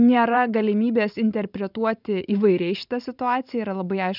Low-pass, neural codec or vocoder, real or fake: 5.4 kHz; vocoder, 44.1 kHz, 128 mel bands every 512 samples, BigVGAN v2; fake